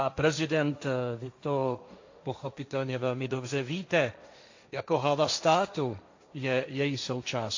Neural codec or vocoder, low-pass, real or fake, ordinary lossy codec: codec, 16 kHz, 1.1 kbps, Voila-Tokenizer; 7.2 kHz; fake; AAC, 48 kbps